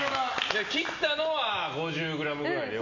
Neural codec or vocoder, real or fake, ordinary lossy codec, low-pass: none; real; none; 7.2 kHz